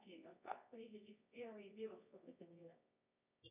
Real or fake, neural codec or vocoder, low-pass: fake; codec, 24 kHz, 0.9 kbps, WavTokenizer, medium music audio release; 3.6 kHz